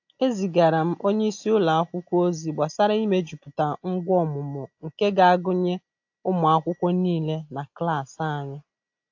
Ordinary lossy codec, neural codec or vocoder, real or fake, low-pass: AAC, 48 kbps; none; real; 7.2 kHz